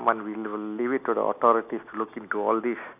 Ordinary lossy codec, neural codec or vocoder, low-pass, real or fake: none; none; 3.6 kHz; real